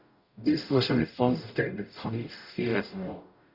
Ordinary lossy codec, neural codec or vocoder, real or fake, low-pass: none; codec, 44.1 kHz, 0.9 kbps, DAC; fake; 5.4 kHz